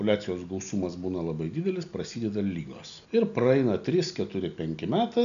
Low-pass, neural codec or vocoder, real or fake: 7.2 kHz; none; real